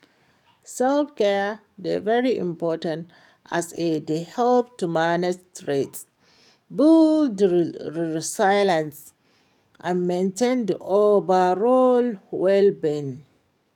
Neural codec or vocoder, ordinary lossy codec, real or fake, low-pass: codec, 44.1 kHz, 7.8 kbps, DAC; none; fake; 19.8 kHz